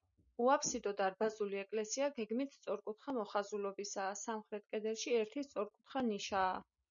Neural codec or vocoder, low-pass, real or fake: none; 7.2 kHz; real